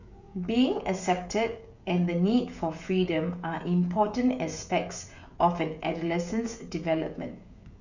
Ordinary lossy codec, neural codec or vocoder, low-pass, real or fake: none; codec, 16 kHz, 16 kbps, FreqCodec, smaller model; 7.2 kHz; fake